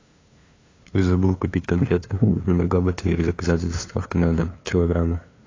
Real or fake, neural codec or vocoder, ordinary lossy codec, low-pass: fake; codec, 16 kHz, 2 kbps, FunCodec, trained on LibriTTS, 25 frames a second; AAC, 32 kbps; 7.2 kHz